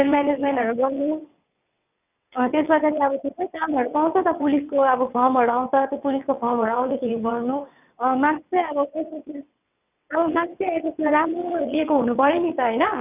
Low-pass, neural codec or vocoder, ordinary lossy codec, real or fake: 3.6 kHz; vocoder, 22.05 kHz, 80 mel bands, WaveNeXt; none; fake